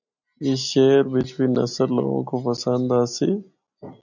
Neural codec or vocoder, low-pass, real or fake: none; 7.2 kHz; real